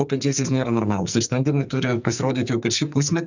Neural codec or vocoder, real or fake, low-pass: codec, 44.1 kHz, 2.6 kbps, SNAC; fake; 7.2 kHz